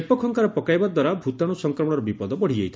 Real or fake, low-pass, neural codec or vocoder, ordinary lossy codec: real; none; none; none